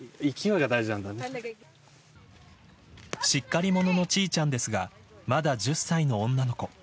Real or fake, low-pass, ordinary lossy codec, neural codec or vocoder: real; none; none; none